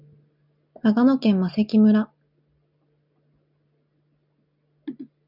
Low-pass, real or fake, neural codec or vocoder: 5.4 kHz; real; none